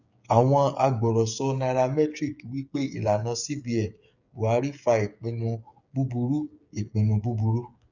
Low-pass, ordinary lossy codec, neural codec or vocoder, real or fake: 7.2 kHz; none; codec, 16 kHz, 8 kbps, FreqCodec, smaller model; fake